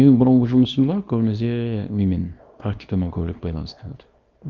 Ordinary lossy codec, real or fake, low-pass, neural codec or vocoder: Opus, 32 kbps; fake; 7.2 kHz; codec, 24 kHz, 0.9 kbps, WavTokenizer, small release